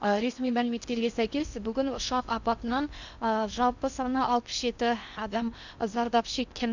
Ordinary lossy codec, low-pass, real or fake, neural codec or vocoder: none; 7.2 kHz; fake; codec, 16 kHz in and 24 kHz out, 0.8 kbps, FocalCodec, streaming, 65536 codes